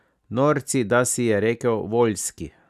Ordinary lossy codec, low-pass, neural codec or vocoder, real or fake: none; 14.4 kHz; none; real